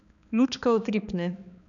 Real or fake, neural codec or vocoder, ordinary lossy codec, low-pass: fake; codec, 16 kHz, 2 kbps, X-Codec, HuBERT features, trained on balanced general audio; none; 7.2 kHz